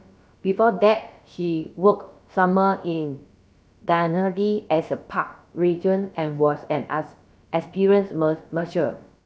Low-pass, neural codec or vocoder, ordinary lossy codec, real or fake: none; codec, 16 kHz, about 1 kbps, DyCAST, with the encoder's durations; none; fake